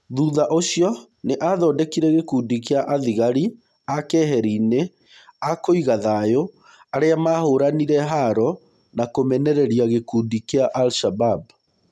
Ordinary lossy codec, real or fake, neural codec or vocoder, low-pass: none; real; none; none